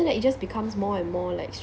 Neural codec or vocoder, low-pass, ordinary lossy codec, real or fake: none; none; none; real